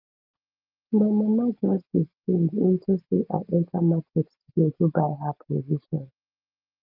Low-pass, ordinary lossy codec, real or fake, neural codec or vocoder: 5.4 kHz; Opus, 32 kbps; real; none